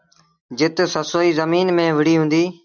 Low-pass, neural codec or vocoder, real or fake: 7.2 kHz; none; real